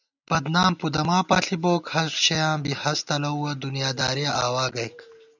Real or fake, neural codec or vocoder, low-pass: real; none; 7.2 kHz